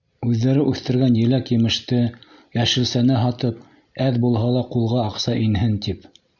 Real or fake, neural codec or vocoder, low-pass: real; none; 7.2 kHz